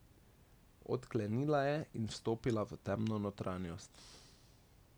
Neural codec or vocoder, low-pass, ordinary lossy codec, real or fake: none; none; none; real